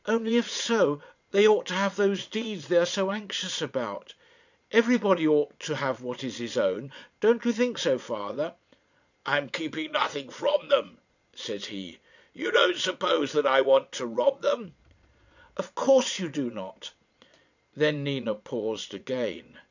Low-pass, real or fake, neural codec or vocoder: 7.2 kHz; fake; vocoder, 22.05 kHz, 80 mel bands, Vocos